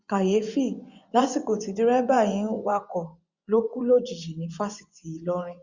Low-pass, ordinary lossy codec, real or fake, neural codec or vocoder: 7.2 kHz; Opus, 64 kbps; fake; vocoder, 24 kHz, 100 mel bands, Vocos